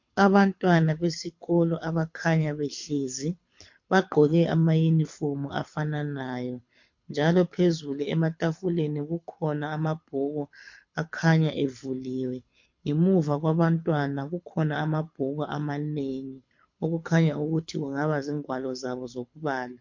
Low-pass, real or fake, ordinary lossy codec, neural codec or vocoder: 7.2 kHz; fake; MP3, 48 kbps; codec, 24 kHz, 6 kbps, HILCodec